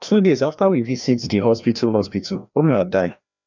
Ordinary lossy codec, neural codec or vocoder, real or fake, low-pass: none; codec, 16 kHz, 1 kbps, FreqCodec, larger model; fake; 7.2 kHz